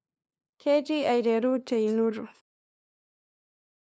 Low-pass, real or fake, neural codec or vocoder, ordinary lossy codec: none; fake; codec, 16 kHz, 2 kbps, FunCodec, trained on LibriTTS, 25 frames a second; none